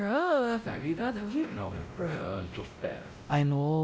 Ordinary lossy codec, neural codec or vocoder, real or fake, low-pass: none; codec, 16 kHz, 0.5 kbps, X-Codec, WavLM features, trained on Multilingual LibriSpeech; fake; none